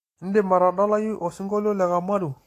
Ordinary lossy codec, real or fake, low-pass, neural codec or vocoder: AAC, 48 kbps; real; 14.4 kHz; none